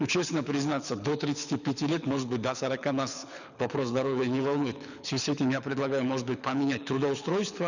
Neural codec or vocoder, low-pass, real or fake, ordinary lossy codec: codec, 24 kHz, 6 kbps, HILCodec; 7.2 kHz; fake; none